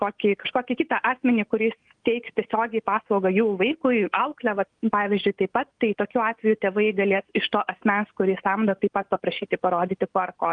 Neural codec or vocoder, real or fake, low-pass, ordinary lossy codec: none; real; 9.9 kHz; Opus, 32 kbps